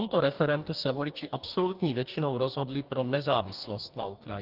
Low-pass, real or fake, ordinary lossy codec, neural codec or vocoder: 5.4 kHz; fake; Opus, 32 kbps; codec, 44.1 kHz, 2.6 kbps, DAC